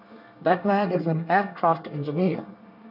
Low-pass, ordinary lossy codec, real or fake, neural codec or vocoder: 5.4 kHz; none; fake; codec, 24 kHz, 1 kbps, SNAC